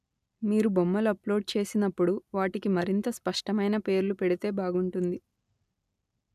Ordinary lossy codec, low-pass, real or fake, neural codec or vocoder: none; 14.4 kHz; real; none